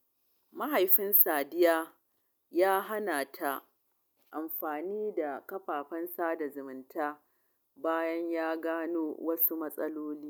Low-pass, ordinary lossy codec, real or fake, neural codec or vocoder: none; none; real; none